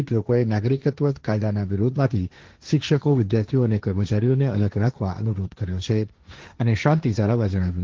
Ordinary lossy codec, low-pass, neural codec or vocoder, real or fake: Opus, 16 kbps; 7.2 kHz; codec, 16 kHz, 1.1 kbps, Voila-Tokenizer; fake